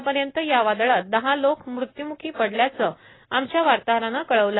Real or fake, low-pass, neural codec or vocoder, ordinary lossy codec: real; 7.2 kHz; none; AAC, 16 kbps